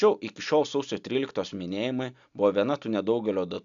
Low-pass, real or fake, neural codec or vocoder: 7.2 kHz; real; none